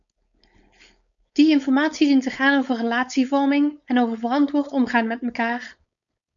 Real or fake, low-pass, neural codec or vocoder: fake; 7.2 kHz; codec, 16 kHz, 4.8 kbps, FACodec